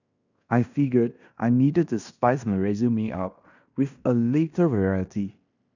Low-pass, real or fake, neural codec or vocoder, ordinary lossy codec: 7.2 kHz; fake; codec, 16 kHz in and 24 kHz out, 0.9 kbps, LongCat-Audio-Codec, fine tuned four codebook decoder; none